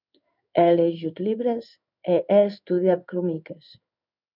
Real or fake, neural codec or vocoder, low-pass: fake; codec, 16 kHz in and 24 kHz out, 1 kbps, XY-Tokenizer; 5.4 kHz